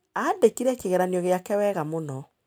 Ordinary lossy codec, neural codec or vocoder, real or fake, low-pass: none; none; real; none